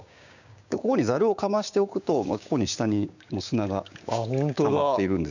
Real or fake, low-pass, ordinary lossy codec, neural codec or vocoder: fake; 7.2 kHz; none; codec, 16 kHz, 6 kbps, DAC